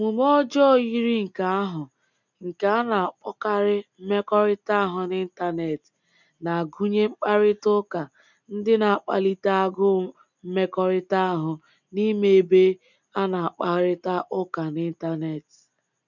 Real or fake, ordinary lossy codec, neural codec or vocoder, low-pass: real; none; none; 7.2 kHz